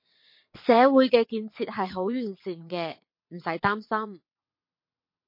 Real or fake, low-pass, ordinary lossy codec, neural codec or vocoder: fake; 5.4 kHz; MP3, 32 kbps; vocoder, 22.05 kHz, 80 mel bands, WaveNeXt